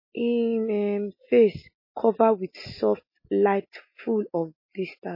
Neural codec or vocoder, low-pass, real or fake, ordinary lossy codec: none; 5.4 kHz; real; MP3, 24 kbps